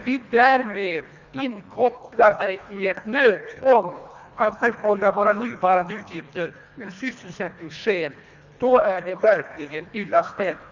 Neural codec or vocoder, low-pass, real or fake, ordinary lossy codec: codec, 24 kHz, 1.5 kbps, HILCodec; 7.2 kHz; fake; Opus, 64 kbps